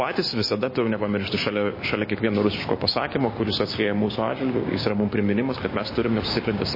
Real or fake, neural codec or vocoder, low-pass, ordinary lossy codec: fake; codec, 16 kHz, 6 kbps, DAC; 5.4 kHz; MP3, 24 kbps